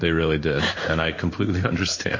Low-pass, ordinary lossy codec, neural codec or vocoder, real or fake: 7.2 kHz; MP3, 32 kbps; codec, 16 kHz in and 24 kHz out, 1 kbps, XY-Tokenizer; fake